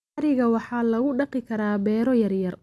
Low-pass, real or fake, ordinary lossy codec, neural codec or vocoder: none; real; none; none